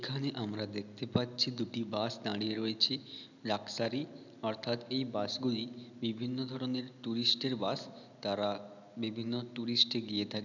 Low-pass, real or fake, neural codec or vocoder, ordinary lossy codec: 7.2 kHz; real; none; none